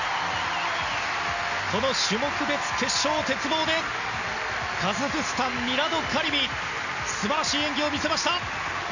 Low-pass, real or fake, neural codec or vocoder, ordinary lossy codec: 7.2 kHz; real; none; none